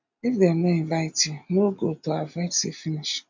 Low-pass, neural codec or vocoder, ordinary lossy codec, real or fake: 7.2 kHz; none; MP3, 64 kbps; real